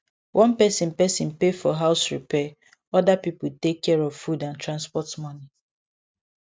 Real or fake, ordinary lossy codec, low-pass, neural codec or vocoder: real; none; none; none